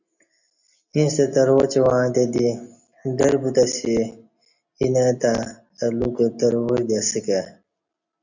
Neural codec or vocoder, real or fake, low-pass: none; real; 7.2 kHz